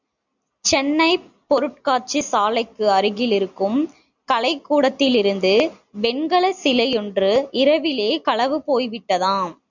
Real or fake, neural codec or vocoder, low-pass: real; none; 7.2 kHz